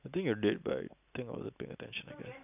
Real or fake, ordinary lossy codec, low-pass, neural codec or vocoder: real; none; 3.6 kHz; none